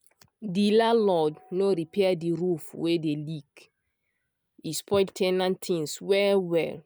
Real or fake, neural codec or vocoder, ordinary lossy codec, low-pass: real; none; none; none